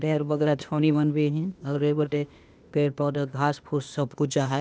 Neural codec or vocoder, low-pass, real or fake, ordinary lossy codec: codec, 16 kHz, 0.8 kbps, ZipCodec; none; fake; none